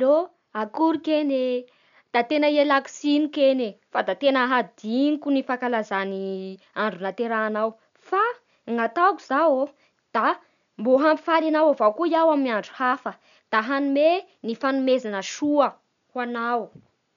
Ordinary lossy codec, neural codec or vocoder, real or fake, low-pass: none; none; real; 7.2 kHz